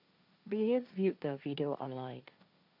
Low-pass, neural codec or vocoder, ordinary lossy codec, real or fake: 5.4 kHz; codec, 16 kHz, 1.1 kbps, Voila-Tokenizer; none; fake